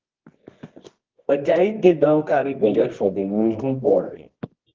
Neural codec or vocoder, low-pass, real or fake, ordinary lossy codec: codec, 24 kHz, 0.9 kbps, WavTokenizer, medium music audio release; 7.2 kHz; fake; Opus, 16 kbps